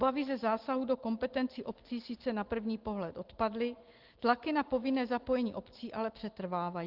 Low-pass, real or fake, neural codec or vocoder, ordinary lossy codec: 5.4 kHz; real; none; Opus, 16 kbps